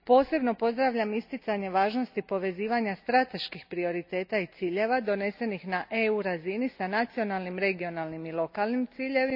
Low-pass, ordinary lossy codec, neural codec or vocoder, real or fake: 5.4 kHz; AAC, 48 kbps; none; real